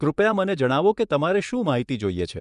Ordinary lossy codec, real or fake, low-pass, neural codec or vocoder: none; fake; 10.8 kHz; vocoder, 24 kHz, 100 mel bands, Vocos